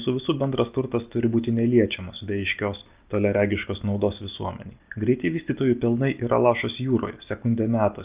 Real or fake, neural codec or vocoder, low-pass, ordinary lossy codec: real; none; 3.6 kHz; Opus, 24 kbps